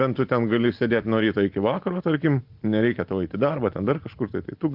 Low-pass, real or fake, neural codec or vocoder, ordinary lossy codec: 5.4 kHz; real; none; Opus, 32 kbps